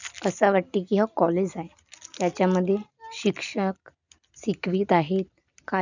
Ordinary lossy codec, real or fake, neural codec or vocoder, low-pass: none; real; none; 7.2 kHz